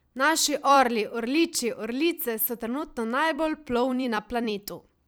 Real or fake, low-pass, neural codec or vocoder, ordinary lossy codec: fake; none; vocoder, 44.1 kHz, 128 mel bands every 256 samples, BigVGAN v2; none